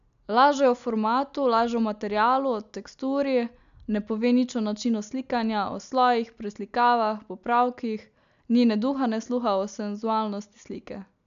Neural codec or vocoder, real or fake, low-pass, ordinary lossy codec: none; real; 7.2 kHz; none